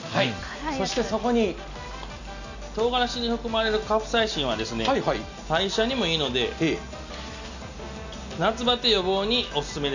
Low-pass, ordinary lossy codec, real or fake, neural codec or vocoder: 7.2 kHz; none; real; none